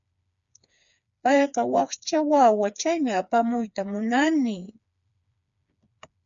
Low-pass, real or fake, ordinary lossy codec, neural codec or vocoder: 7.2 kHz; fake; MP3, 96 kbps; codec, 16 kHz, 4 kbps, FreqCodec, smaller model